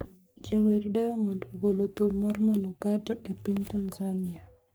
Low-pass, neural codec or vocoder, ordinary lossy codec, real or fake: none; codec, 44.1 kHz, 3.4 kbps, Pupu-Codec; none; fake